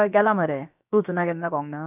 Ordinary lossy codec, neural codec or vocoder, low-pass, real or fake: none; codec, 16 kHz, about 1 kbps, DyCAST, with the encoder's durations; 3.6 kHz; fake